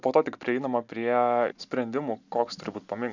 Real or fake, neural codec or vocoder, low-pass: real; none; 7.2 kHz